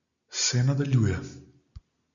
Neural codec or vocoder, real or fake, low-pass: none; real; 7.2 kHz